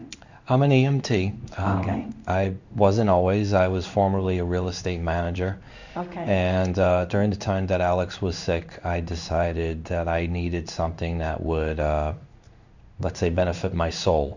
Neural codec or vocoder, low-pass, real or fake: codec, 16 kHz in and 24 kHz out, 1 kbps, XY-Tokenizer; 7.2 kHz; fake